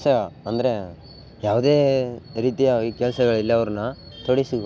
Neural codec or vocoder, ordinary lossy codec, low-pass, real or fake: none; none; none; real